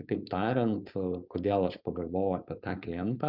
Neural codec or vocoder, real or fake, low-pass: codec, 16 kHz, 4.8 kbps, FACodec; fake; 5.4 kHz